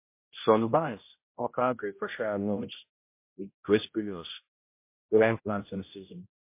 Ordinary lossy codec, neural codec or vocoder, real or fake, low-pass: MP3, 24 kbps; codec, 16 kHz, 0.5 kbps, X-Codec, HuBERT features, trained on general audio; fake; 3.6 kHz